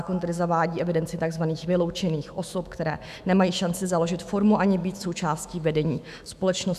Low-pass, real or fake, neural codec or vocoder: 14.4 kHz; fake; autoencoder, 48 kHz, 128 numbers a frame, DAC-VAE, trained on Japanese speech